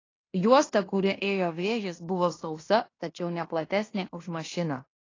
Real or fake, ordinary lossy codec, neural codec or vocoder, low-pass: fake; AAC, 32 kbps; codec, 16 kHz in and 24 kHz out, 0.9 kbps, LongCat-Audio-Codec, fine tuned four codebook decoder; 7.2 kHz